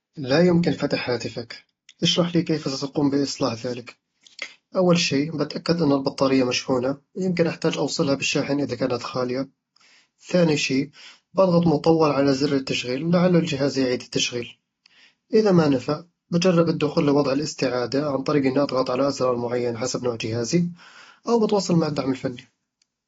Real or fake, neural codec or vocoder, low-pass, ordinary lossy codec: real; none; 7.2 kHz; AAC, 24 kbps